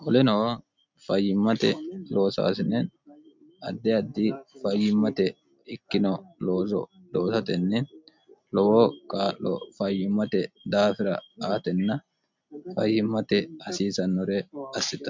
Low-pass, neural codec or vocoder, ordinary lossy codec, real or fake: 7.2 kHz; none; MP3, 64 kbps; real